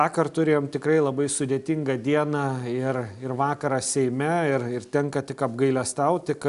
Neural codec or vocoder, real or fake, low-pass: none; real; 10.8 kHz